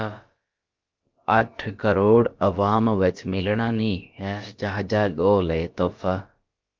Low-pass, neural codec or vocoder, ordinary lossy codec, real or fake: 7.2 kHz; codec, 16 kHz, about 1 kbps, DyCAST, with the encoder's durations; Opus, 32 kbps; fake